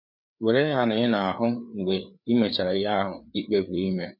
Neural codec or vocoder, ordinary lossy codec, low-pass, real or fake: codec, 16 kHz, 4 kbps, FreqCodec, larger model; none; 5.4 kHz; fake